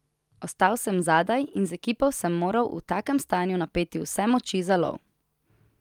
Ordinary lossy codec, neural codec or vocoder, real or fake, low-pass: Opus, 32 kbps; none; real; 19.8 kHz